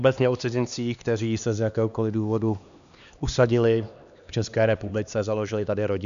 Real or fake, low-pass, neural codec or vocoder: fake; 7.2 kHz; codec, 16 kHz, 2 kbps, X-Codec, HuBERT features, trained on LibriSpeech